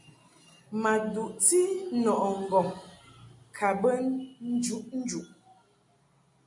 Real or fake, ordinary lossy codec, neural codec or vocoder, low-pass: real; MP3, 64 kbps; none; 10.8 kHz